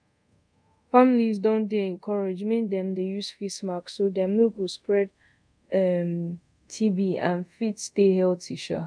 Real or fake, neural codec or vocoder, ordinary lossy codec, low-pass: fake; codec, 24 kHz, 0.5 kbps, DualCodec; none; 9.9 kHz